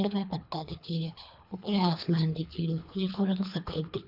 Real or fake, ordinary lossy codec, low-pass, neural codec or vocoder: fake; none; 5.4 kHz; codec, 24 kHz, 3 kbps, HILCodec